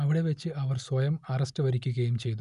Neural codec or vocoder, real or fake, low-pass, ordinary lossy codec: none; real; 10.8 kHz; none